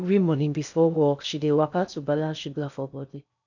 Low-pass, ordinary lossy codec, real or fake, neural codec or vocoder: 7.2 kHz; none; fake; codec, 16 kHz in and 24 kHz out, 0.6 kbps, FocalCodec, streaming, 2048 codes